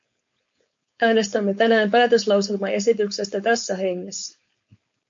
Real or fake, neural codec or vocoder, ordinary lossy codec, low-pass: fake; codec, 16 kHz, 4.8 kbps, FACodec; MP3, 48 kbps; 7.2 kHz